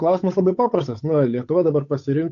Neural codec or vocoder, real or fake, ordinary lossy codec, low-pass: codec, 16 kHz, 8 kbps, FunCodec, trained on Chinese and English, 25 frames a second; fake; AAC, 48 kbps; 7.2 kHz